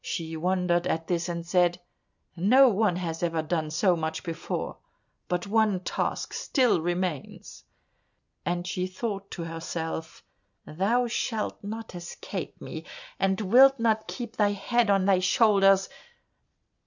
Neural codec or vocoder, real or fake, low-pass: none; real; 7.2 kHz